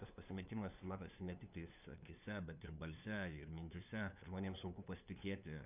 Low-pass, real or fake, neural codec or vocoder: 3.6 kHz; fake; codec, 16 kHz, 2 kbps, FunCodec, trained on LibriTTS, 25 frames a second